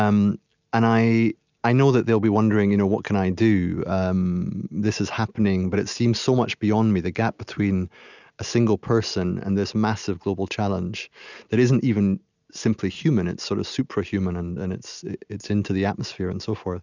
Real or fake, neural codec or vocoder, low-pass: real; none; 7.2 kHz